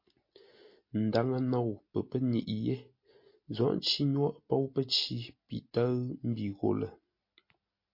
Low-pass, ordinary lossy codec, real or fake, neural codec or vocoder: 5.4 kHz; MP3, 32 kbps; real; none